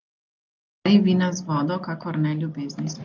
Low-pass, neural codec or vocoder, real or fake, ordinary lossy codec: 7.2 kHz; none; real; Opus, 24 kbps